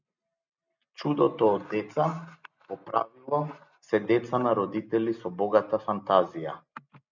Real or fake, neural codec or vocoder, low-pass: real; none; 7.2 kHz